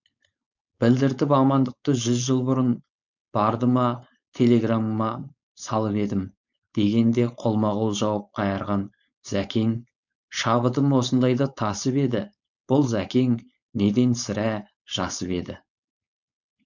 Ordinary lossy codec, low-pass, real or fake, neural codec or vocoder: none; 7.2 kHz; fake; codec, 16 kHz, 4.8 kbps, FACodec